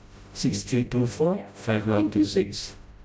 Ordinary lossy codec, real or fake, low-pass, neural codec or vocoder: none; fake; none; codec, 16 kHz, 0.5 kbps, FreqCodec, smaller model